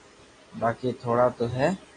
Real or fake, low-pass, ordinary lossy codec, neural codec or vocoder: real; 9.9 kHz; AAC, 32 kbps; none